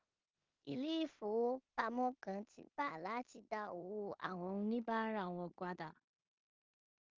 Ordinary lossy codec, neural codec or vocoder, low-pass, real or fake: Opus, 24 kbps; codec, 16 kHz in and 24 kHz out, 0.4 kbps, LongCat-Audio-Codec, two codebook decoder; 7.2 kHz; fake